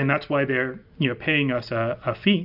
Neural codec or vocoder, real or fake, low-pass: none; real; 5.4 kHz